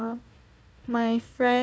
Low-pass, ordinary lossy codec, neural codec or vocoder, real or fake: none; none; codec, 16 kHz, 1 kbps, FunCodec, trained on Chinese and English, 50 frames a second; fake